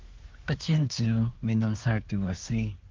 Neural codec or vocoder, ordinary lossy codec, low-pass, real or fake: codec, 24 kHz, 1 kbps, SNAC; Opus, 24 kbps; 7.2 kHz; fake